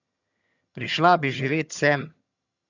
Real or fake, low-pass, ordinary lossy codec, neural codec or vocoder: fake; 7.2 kHz; none; vocoder, 22.05 kHz, 80 mel bands, HiFi-GAN